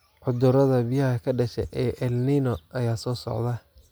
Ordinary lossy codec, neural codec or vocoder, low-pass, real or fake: none; none; none; real